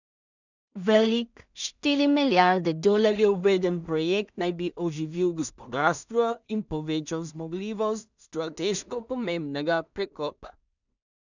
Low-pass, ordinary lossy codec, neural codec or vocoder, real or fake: 7.2 kHz; none; codec, 16 kHz in and 24 kHz out, 0.4 kbps, LongCat-Audio-Codec, two codebook decoder; fake